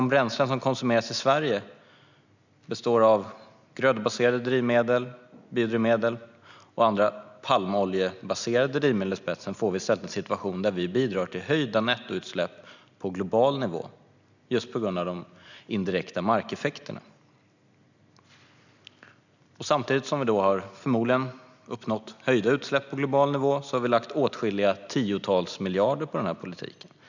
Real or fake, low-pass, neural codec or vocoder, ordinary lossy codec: real; 7.2 kHz; none; none